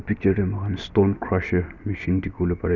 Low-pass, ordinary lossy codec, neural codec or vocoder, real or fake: 7.2 kHz; none; none; real